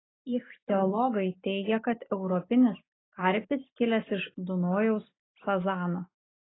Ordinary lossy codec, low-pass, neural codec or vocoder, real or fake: AAC, 16 kbps; 7.2 kHz; none; real